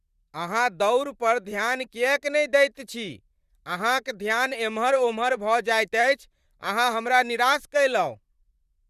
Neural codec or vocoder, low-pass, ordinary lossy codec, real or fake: vocoder, 44.1 kHz, 128 mel bands, Pupu-Vocoder; 14.4 kHz; Opus, 64 kbps; fake